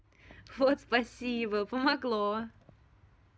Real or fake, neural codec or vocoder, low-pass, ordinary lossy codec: real; none; none; none